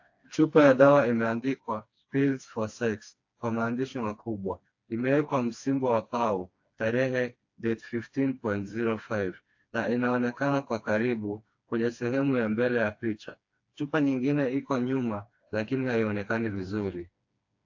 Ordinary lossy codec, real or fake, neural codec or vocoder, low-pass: AAC, 48 kbps; fake; codec, 16 kHz, 2 kbps, FreqCodec, smaller model; 7.2 kHz